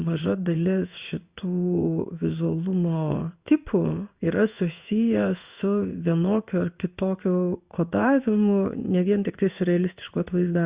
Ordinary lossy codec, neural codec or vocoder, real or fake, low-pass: Opus, 64 kbps; codec, 16 kHz in and 24 kHz out, 1 kbps, XY-Tokenizer; fake; 3.6 kHz